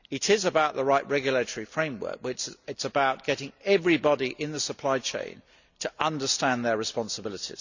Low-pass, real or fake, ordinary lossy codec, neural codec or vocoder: 7.2 kHz; real; none; none